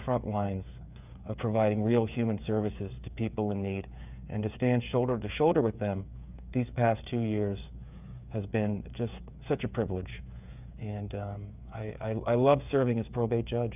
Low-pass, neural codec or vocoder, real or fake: 3.6 kHz; codec, 16 kHz, 8 kbps, FreqCodec, smaller model; fake